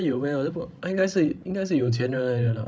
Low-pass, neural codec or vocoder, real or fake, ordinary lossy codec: none; codec, 16 kHz, 16 kbps, FreqCodec, larger model; fake; none